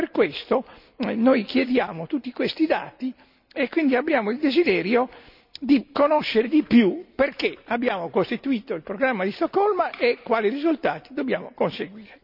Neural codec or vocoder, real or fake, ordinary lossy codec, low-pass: none; real; none; 5.4 kHz